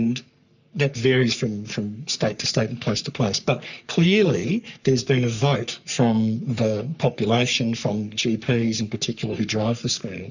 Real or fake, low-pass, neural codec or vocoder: fake; 7.2 kHz; codec, 44.1 kHz, 3.4 kbps, Pupu-Codec